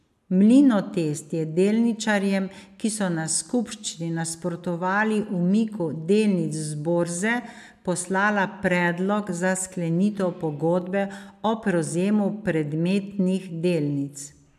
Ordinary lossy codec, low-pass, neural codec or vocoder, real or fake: MP3, 96 kbps; 14.4 kHz; none; real